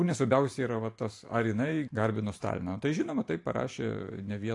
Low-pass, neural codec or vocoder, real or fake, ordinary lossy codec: 10.8 kHz; vocoder, 44.1 kHz, 128 mel bands every 512 samples, BigVGAN v2; fake; AAC, 48 kbps